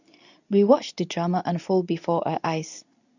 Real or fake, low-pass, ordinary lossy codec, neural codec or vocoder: fake; 7.2 kHz; none; codec, 24 kHz, 0.9 kbps, WavTokenizer, medium speech release version 2